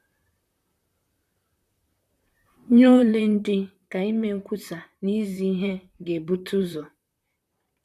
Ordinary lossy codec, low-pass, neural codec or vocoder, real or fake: none; 14.4 kHz; vocoder, 44.1 kHz, 128 mel bands, Pupu-Vocoder; fake